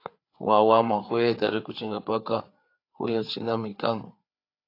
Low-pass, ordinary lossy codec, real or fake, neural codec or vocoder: 5.4 kHz; AAC, 32 kbps; fake; codec, 16 kHz, 4 kbps, FreqCodec, larger model